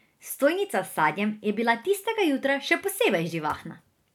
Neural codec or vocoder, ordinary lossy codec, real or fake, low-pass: none; none; real; 19.8 kHz